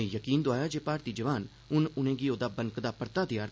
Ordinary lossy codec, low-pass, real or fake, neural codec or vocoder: none; none; real; none